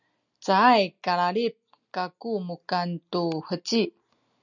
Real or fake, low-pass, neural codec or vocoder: real; 7.2 kHz; none